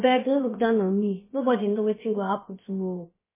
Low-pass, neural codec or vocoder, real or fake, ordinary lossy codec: 3.6 kHz; codec, 16 kHz, about 1 kbps, DyCAST, with the encoder's durations; fake; MP3, 16 kbps